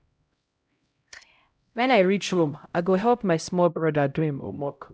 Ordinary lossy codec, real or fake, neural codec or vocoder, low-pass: none; fake; codec, 16 kHz, 0.5 kbps, X-Codec, HuBERT features, trained on LibriSpeech; none